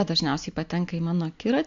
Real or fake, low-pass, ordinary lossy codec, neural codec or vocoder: real; 7.2 kHz; AAC, 64 kbps; none